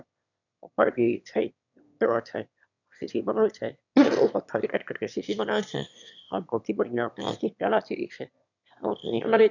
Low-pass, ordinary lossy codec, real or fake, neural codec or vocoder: 7.2 kHz; none; fake; autoencoder, 22.05 kHz, a latent of 192 numbers a frame, VITS, trained on one speaker